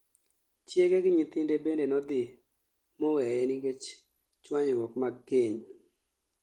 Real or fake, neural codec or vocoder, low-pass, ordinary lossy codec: real; none; 19.8 kHz; Opus, 24 kbps